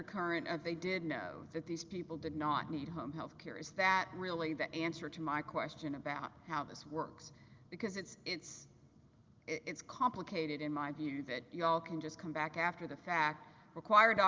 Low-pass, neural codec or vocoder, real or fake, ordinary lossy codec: 7.2 kHz; none; real; Opus, 32 kbps